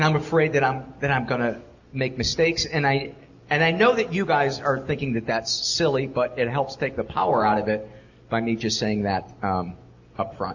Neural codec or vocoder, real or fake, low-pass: none; real; 7.2 kHz